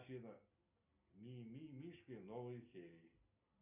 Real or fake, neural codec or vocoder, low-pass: real; none; 3.6 kHz